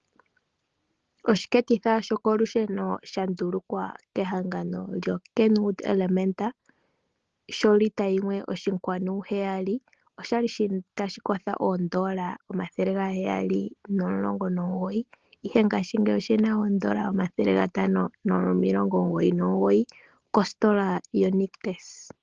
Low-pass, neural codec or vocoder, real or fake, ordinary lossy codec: 7.2 kHz; none; real; Opus, 16 kbps